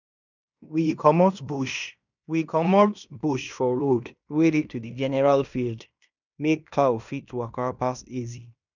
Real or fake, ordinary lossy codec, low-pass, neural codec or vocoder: fake; none; 7.2 kHz; codec, 16 kHz in and 24 kHz out, 0.9 kbps, LongCat-Audio-Codec, fine tuned four codebook decoder